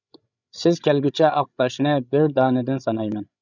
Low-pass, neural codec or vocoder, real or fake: 7.2 kHz; codec, 16 kHz, 16 kbps, FreqCodec, larger model; fake